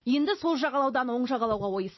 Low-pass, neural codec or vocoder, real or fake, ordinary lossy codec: 7.2 kHz; none; real; MP3, 24 kbps